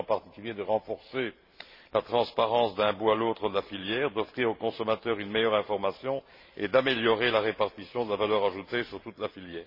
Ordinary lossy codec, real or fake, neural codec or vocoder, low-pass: MP3, 24 kbps; fake; vocoder, 44.1 kHz, 128 mel bands every 512 samples, BigVGAN v2; 5.4 kHz